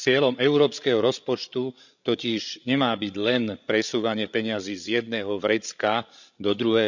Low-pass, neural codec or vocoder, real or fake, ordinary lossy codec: 7.2 kHz; codec, 16 kHz, 16 kbps, FreqCodec, larger model; fake; none